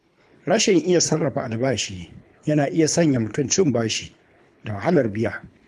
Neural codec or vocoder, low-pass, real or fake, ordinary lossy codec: codec, 24 kHz, 3 kbps, HILCodec; none; fake; none